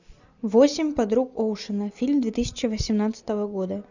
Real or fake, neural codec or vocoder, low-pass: real; none; 7.2 kHz